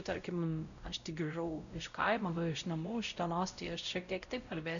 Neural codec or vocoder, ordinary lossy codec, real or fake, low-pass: codec, 16 kHz, 0.5 kbps, X-Codec, WavLM features, trained on Multilingual LibriSpeech; MP3, 96 kbps; fake; 7.2 kHz